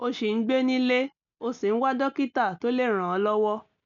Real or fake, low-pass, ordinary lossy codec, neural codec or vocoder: real; 7.2 kHz; none; none